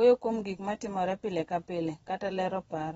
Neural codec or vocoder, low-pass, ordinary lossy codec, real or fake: vocoder, 22.05 kHz, 80 mel bands, Vocos; 9.9 kHz; AAC, 24 kbps; fake